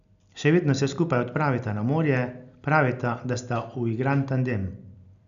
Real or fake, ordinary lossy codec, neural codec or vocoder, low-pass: real; none; none; 7.2 kHz